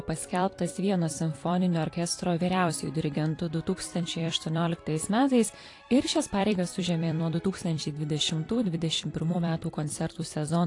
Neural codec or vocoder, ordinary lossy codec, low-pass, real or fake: vocoder, 44.1 kHz, 128 mel bands every 256 samples, BigVGAN v2; AAC, 48 kbps; 10.8 kHz; fake